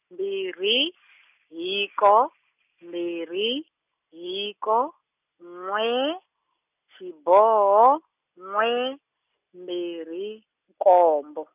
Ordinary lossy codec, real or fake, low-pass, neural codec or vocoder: none; real; 3.6 kHz; none